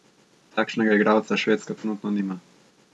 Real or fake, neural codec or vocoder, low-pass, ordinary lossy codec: real; none; none; none